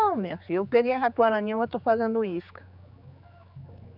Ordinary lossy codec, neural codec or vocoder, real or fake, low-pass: none; codec, 16 kHz, 4 kbps, X-Codec, HuBERT features, trained on general audio; fake; 5.4 kHz